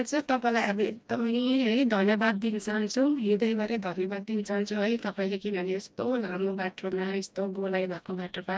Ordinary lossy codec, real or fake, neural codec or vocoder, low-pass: none; fake; codec, 16 kHz, 1 kbps, FreqCodec, smaller model; none